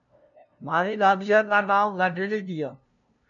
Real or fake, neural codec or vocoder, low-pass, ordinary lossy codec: fake; codec, 16 kHz, 0.5 kbps, FunCodec, trained on LibriTTS, 25 frames a second; 7.2 kHz; AAC, 64 kbps